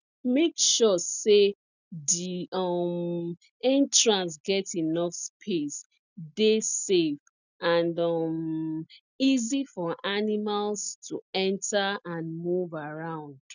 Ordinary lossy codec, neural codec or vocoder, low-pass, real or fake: none; none; 7.2 kHz; real